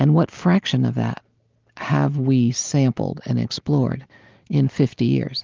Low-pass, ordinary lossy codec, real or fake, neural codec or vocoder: 7.2 kHz; Opus, 24 kbps; real; none